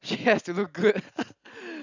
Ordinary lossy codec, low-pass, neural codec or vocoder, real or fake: none; 7.2 kHz; none; real